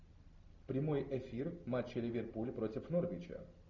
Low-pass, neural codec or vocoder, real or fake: 7.2 kHz; none; real